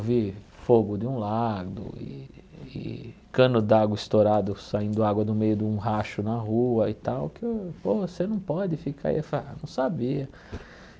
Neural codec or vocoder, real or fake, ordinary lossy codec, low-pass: none; real; none; none